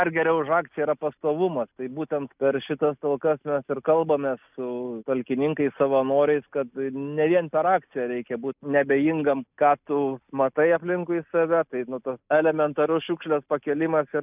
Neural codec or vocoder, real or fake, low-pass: none; real; 3.6 kHz